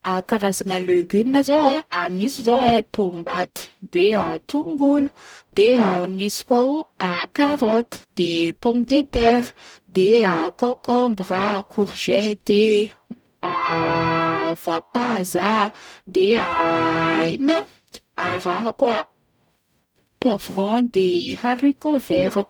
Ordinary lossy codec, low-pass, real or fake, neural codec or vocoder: none; none; fake; codec, 44.1 kHz, 0.9 kbps, DAC